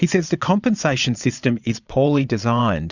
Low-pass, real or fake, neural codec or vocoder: 7.2 kHz; fake; vocoder, 22.05 kHz, 80 mel bands, Vocos